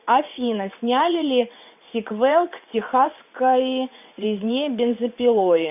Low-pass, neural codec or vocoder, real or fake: 3.6 kHz; vocoder, 22.05 kHz, 80 mel bands, WaveNeXt; fake